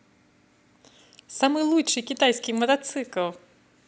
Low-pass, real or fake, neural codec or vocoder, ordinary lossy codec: none; real; none; none